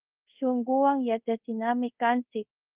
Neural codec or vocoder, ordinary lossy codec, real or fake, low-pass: codec, 24 kHz, 0.5 kbps, DualCodec; Opus, 32 kbps; fake; 3.6 kHz